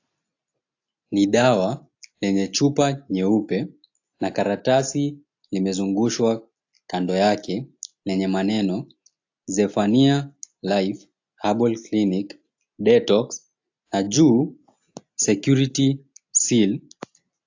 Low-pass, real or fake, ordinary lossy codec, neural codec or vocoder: 7.2 kHz; real; AAC, 48 kbps; none